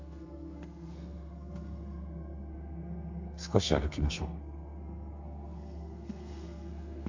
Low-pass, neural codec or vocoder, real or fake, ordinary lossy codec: 7.2 kHz; codec, 32 kHz, 1.9 kbps, SNAC; fake; MP3, 64 kbps